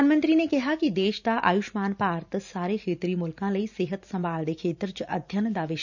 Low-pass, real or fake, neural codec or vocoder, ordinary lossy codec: 7.2 kHz; fake; vocoder, 44.1 kHz, 128 mel bands every 512 samples, BigVGAN v2; none